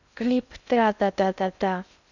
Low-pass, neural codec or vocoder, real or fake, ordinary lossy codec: 7.2 kHz; codec, 16 kHz in and 24 kHz out, 0.6 kbps, FocalCodec, streaming, 2048 codes; fake; none